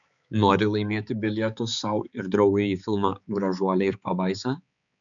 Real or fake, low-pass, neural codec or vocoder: fake; 7.2 kHz; codec, 16 kHz, 4 kbps, X-Codec, HuBERT features, trained on balanced general audio